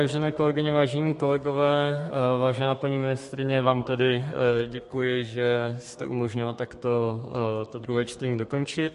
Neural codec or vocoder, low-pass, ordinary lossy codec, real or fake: codec, 32 kHz, 1.9 kbps, SNAC; 14.4 kHz; MP3, 48 kbps; fake